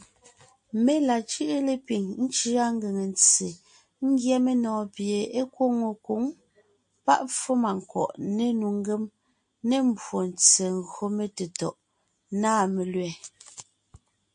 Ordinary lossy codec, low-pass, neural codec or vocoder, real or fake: MP3, 48 kbps; 9.9 kHz; none; real